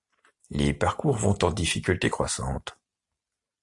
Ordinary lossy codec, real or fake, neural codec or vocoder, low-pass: AAC, 64 kbps; real; none; 10.8 kHz